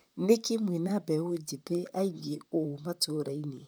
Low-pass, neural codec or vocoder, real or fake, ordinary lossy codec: none; vocoder, 44.1 kHz, 128 mel bands, Pupu-Vocoder; fake; none